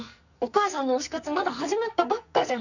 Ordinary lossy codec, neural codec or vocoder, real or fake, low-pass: none; codec, 44.1 kHz, 2.6 kbps, SNAC; fake; 7.2 kHz